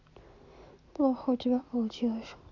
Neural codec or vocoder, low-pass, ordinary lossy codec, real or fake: none; 7.2 kHz; none; real